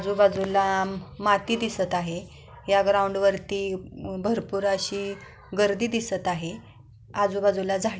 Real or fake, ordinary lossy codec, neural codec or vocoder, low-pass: real; none; none; none